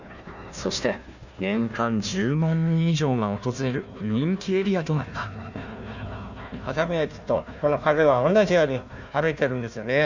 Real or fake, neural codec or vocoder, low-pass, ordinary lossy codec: fake; codec, 16 kHz, 1 kbps, FunCodec, trained on Chinese and English, 50 frames a second; 7.2 kHz; none